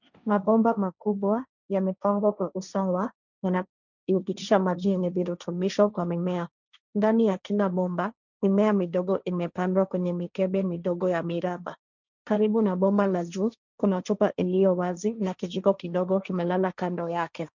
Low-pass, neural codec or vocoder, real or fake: 7.2 kHz; codec, 16 kHz, 1.1 kbps, Voila-Tokenizer; fake